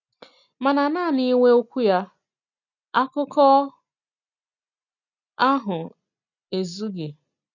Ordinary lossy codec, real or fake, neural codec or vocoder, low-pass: none; real; none; 7.2 kHz